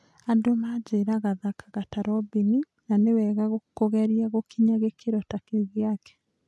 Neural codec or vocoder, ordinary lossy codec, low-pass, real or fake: none; none; none; real